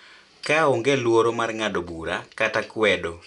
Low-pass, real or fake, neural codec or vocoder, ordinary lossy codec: 10.8 kHz; real; none; none